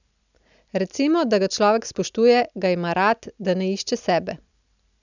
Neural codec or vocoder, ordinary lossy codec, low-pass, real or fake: none; none; 7.2 kHz; real